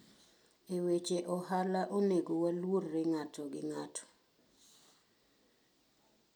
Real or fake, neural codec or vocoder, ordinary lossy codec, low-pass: real; none; none; none